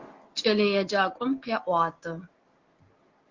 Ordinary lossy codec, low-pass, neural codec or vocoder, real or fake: Opus, 16 kbps; 7.2 kHz; none; real